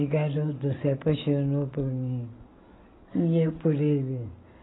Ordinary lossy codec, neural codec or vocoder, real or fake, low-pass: AAC, 16 kbps; none; real; 7.2 kHz